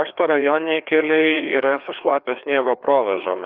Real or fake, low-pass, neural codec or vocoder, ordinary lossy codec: fake; 5.4 kHz; codec, 16 kHz, 2 kbps, FreqCodec, larger model; Opus, 24 kbps